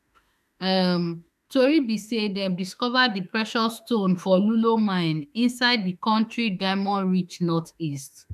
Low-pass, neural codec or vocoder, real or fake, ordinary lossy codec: 14.4 kHz; autoencoder, 48 kHz, 32 numbers a frame, DAC-VAE, trained on Japanese speech; fake; none